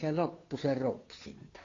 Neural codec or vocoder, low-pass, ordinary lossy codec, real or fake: codec, 16 kHz, 2 kbps, FunCodec, trained on Chinese and English, 25 frames a second; 7.2 kHz; AAC, 32 kbps; fake